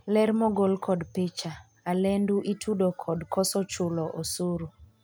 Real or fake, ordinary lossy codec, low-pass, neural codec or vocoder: real; none; none; none